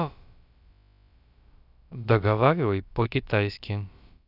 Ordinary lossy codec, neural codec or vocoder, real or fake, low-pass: none; codec, 16 kHz, about 1 kbps, DyCAST, with the encoder's durations; fake; 5.4 kHz